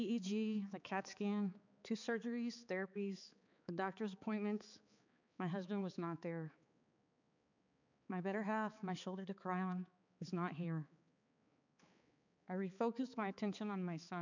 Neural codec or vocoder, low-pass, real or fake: codec, 16 kHz, 4 kbps, X-Codec, HuBERT features, trained on balanced general audio; 7.2 kHz; fake